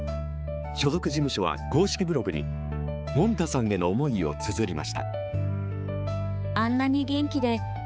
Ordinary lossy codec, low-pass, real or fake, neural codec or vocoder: none; none; fake; codec, 16 kHz, 4 kbps, X-Codec, HuBERT features, trained on balanced general audio